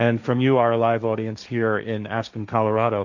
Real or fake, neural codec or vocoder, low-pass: fake; codec, 16 kHz, 1.1 kbps, Voila-Tokenizer; 7.2 kHz